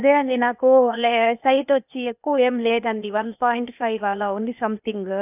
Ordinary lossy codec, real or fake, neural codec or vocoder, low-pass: none; fake; codec, 16 kHz, 0.8 kbps, ZipCodec; 3.6 kHz